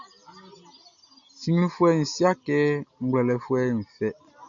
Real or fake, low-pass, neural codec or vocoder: real; 7.2 kHz; none